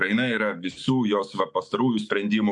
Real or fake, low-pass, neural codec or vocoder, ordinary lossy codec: fake; 10.8 kHz; autoencoder, 48 kHz, 128 numbers a frame, DAC-VAE, trained on Japanese speech; MP3, 64 kbps